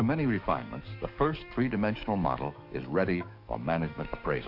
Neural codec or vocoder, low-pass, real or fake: codec, 44.1 kHz, 7.8 kbps, DAC; 5.4 kHz; fake